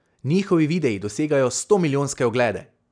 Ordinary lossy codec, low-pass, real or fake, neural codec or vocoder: none; 9.9 kHz; real; none